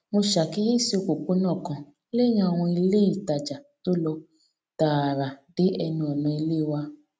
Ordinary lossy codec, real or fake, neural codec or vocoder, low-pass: none; real; none; none